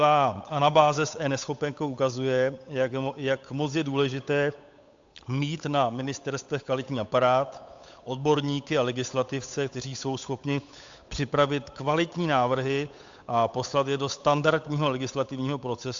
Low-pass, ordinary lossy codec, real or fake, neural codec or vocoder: 7.2 kHz; AAC, 64 kbps; fake; codec, 16 kHz, 8 kbps, FunCodec, trained on Chinese and English, 25 frames a second